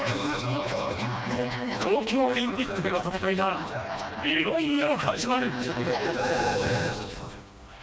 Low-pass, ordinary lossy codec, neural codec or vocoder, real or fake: none; none; codec, 16 kHz, 1 kbps, FreqCodec, smaller model; fake